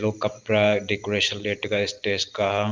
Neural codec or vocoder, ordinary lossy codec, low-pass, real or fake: vocoder, 44.1 kHz, 128 mel bands every 512 samples, BigVGAN v2; Opus, 24 kbps; 7.2 kHz; fake